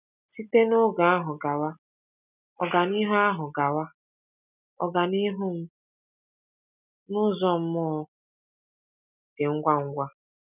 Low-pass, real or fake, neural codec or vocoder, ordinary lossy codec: 3.6 kHz; real; none; none